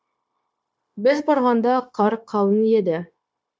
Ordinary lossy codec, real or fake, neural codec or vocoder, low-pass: none; fake; codec, 16 kHz, 0.9 kbps, LongCat-Audio-Codec; none